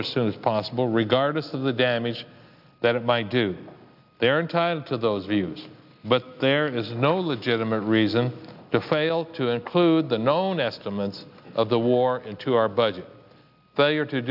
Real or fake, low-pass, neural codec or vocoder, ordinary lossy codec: real; 5.4 kHz; none; AAC, 48 kbps